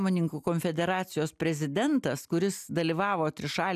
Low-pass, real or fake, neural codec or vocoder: 14.4 kHz; real; none